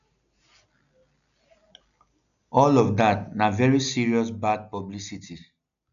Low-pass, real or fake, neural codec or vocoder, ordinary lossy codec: 7.2 kHz; real; none; none